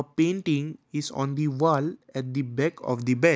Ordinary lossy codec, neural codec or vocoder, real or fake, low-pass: none; none; real; none